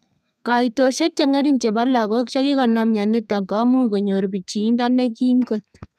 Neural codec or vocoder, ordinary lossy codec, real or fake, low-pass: codec, 32 kHz, 1.9 kbps, SNAC; none; fake; 14.4 kHz